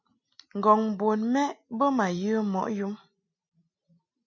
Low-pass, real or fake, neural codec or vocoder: 7.2 kHz; real; none